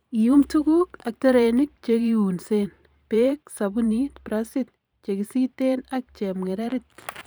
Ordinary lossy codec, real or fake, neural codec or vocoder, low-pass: none; fake; vocoder, 44.1 kHz, 128 mel bands every 512 samples, BigVGAN v2; none